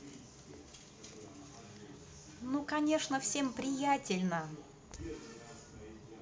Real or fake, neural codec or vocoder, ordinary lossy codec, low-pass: real; none; none; none